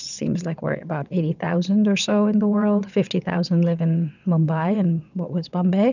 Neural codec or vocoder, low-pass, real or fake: vocoder, 22.05 kHz, 80 mel bands, WaveNeXt; 7.2 kHz; fake